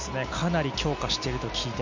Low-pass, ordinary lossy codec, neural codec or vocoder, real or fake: 7.2 kHz; MP3, 64 kbps; none; real